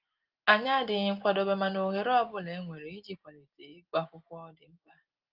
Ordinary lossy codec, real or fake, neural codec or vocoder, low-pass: Opus, 32 kbps; real; none; 5.4 kHz